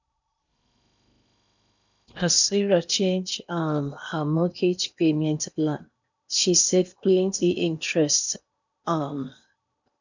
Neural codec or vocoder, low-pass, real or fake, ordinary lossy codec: codec, 16 kHz in and 24 kHz out, 0.8 kbps, FocalCodec, streaming, 65536 codes; 7.2 kHz; fake; none